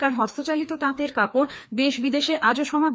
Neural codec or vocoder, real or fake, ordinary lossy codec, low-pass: codec, 16 kHz, 2 kbps, FreqCodec, larger model; fake; none; none